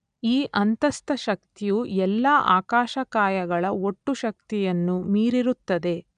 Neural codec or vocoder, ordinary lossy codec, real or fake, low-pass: none; none; real; 9.9 kHz